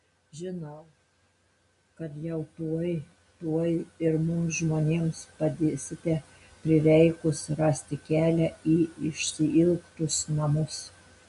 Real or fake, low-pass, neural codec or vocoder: real; 10.8 kHz; none